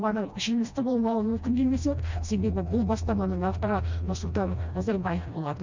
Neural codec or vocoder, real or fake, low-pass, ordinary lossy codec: codec, 16 kHz, 1 kbps, FreqCodec, smaller model; fake; 7.2 kHz; MP3, 64 kbps